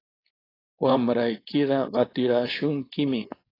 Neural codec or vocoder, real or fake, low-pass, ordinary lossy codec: codec, 16 kHz, 4.8 kbps, FACodec; fake; 5.4 kHz; AAC, 24 kbps